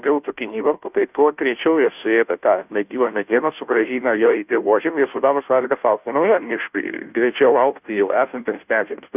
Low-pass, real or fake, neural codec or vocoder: 3.6 kHz; fake; codec, 16 kHz, 0.5 kbps, FunCodec, trained on Chinese and English, 25 frames a second